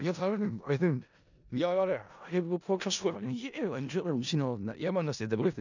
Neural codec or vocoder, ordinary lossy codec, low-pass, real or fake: codec, 16 kHz in and 24 kHz out, 0.4 kbps, LongCat-Audio-Codec, four codebook decoder; none; 7.2 kHz; fake